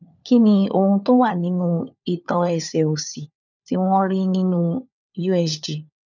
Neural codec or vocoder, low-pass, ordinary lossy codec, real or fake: codec, 16 kHz, 4 kbps, FunCodec, trained on LibriTTS, 50 frames a second; 7.2 kHz; none; fake